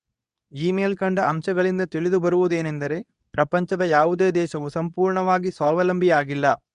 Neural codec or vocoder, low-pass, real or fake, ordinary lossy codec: codec, 24 kHz, 0.9 kbps, WavTokenizer, medium speech release version 2; 10.8 kHz; fake; none